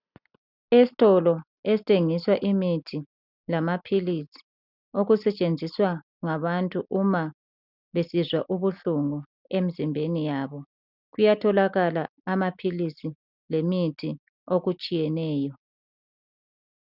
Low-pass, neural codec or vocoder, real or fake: 5.4 kHz; none; real